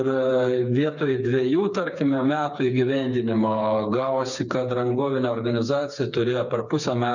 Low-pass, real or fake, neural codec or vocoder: 7.2 kHz; fake; codec, 16 kHz, 4 kbps, FreqCodec, smaller model